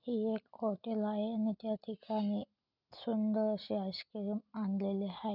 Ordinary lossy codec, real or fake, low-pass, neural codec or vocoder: none; real; 5.4 kHz; none